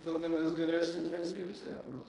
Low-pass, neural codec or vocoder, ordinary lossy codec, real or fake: 10.8 kHz; codec, 16 kHz in and 24 kHz out, 0.9 kbps, LongCat-Audio-Codec, fine tuned four codebook decoder; MP3, 96 kbps; fake